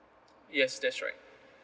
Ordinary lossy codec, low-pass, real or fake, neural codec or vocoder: none; none; real; none